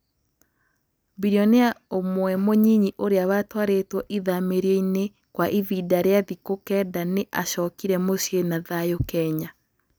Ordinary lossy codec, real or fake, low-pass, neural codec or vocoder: none; real; none; none